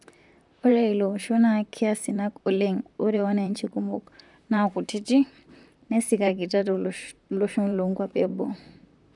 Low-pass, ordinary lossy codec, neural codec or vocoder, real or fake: 10.8 kHz; none; vocoder, 44.1 kHz, 128 mel bands, Pupu-Vocoder; fake